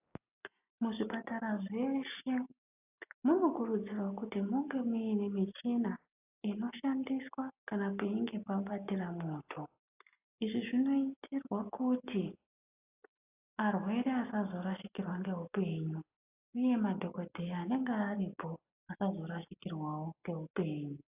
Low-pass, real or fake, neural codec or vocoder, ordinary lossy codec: 3.6 kHz; real; none; AAC, 32 kbps